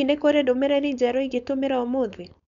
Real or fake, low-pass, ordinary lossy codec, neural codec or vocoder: fake; 7.2 kHz; MP3, 96 kbps; codec, 16 kHz, 4.8 kbps, FACodec